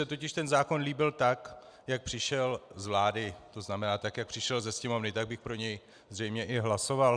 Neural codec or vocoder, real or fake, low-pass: none; real; 9.9 kHz